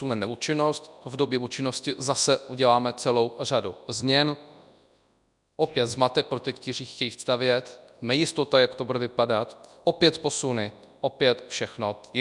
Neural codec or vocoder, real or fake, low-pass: codec, 24 kHz, 0.9 kbps, WavTokenizer, large speech release; fake; 10.8 kHz